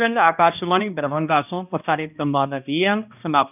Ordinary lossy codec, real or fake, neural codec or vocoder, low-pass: none; fake; codec, 16 kHz, 1 kbps, X-Codec, HuBERT features, trained on balanced general audio; 3.6 kHz